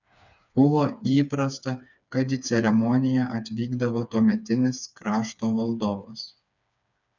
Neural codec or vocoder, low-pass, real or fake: codec, 16 kHz, 4 kbps, FreqCodec, smaller model; 7.2 kHz; fake